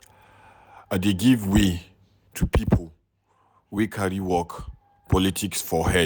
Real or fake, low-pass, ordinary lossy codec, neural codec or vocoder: real; none; none; none